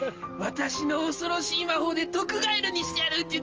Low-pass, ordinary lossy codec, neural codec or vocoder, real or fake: 7.2 kHz; Opus, 24 kbps; none; real